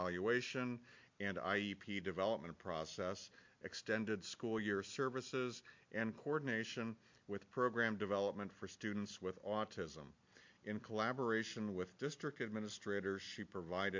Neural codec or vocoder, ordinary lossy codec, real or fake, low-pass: none; MP3, 48 kbps; real; 7.2 kHz